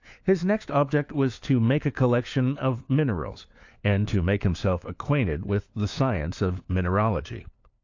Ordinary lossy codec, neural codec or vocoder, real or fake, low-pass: AAC, 48 kbps; codec, 16 kHz, 4 kbps, FunCodec, trained on LibriTTS, 50 frames a second; fake; 7.2 kHz